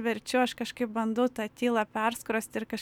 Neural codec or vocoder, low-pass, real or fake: none; 19.8 kHz; real